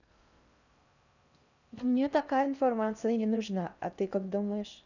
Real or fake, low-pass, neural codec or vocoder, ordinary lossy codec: fake; 7.2 kHz; codec, 16 kHz in and 24 kHz out, 0.6 kbps, FocalCodec, streaming, 4096 codes; none